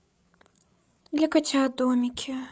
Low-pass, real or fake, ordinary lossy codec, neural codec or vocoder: none; fake; none; codec, 16 kHz, 8 kbps, FreqCodec, larger model